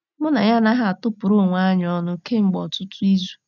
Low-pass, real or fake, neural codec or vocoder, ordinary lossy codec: 7.2 kHz; real; none; none